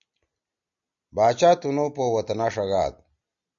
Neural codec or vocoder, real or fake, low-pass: none; real; 7.2 kHz